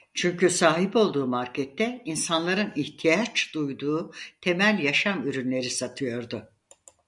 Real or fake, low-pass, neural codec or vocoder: real; 10.8 kHz; none